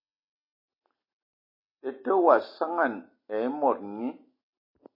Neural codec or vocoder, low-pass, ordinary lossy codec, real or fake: none; 5.4 kHz; MP3, 24 kbps; real